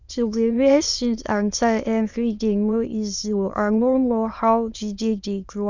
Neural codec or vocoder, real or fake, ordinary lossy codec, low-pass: autoencoder, 22.05 kHz, a latent of 192 numbers a frame, VITS, trained on many speakers; fake; Opus, 64 kbps; 7.2 kHz